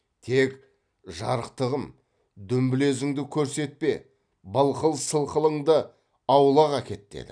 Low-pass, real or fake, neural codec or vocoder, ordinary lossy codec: 9.9 kHz; real; none; none